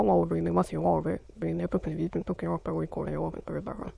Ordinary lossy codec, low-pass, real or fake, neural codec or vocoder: none; none; fake; autoencoder, 22.05 kHz, a latent of 192 numbers a frame, VITS, trained on many speakers